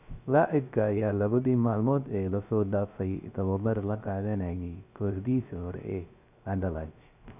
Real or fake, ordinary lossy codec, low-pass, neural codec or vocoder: fake; none; 3.6 kHz; codec, 16 kHz, 0.3 kbps, FocalCodec